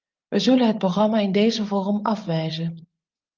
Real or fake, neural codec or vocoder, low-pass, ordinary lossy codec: fake; vocoder, 24 kHz, 100 mel bands, Vocos; 7.2 kHz; Opus, 24 kbps